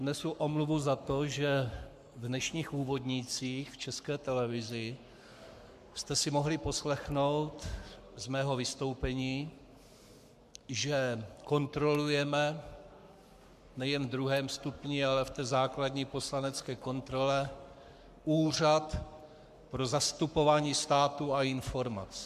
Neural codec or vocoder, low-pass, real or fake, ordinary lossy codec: codec, 44.1 kHz, 7.8 kbps, Pupu-Codec; 14.4 kHz; fake; MP3, 96 kbps